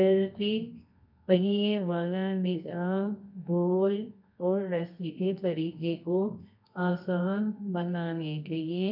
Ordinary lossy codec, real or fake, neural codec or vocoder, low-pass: none; fake; codec, 24 kHz, 0.9 kbps, WavTokenizer, medium music audio release; 5.4 kHz